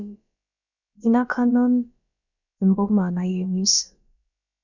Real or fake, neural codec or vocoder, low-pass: fake; codec, 16 kHz, about 1 kbps, DyCAST, with the encoder's durations; 7.2 kHz